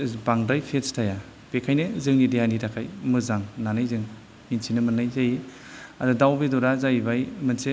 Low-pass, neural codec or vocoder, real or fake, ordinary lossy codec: none; none; real; none